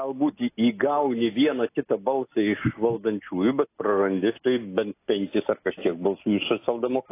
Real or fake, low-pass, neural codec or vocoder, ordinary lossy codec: real; 3.6 kHz; none; AAC, 24 kbps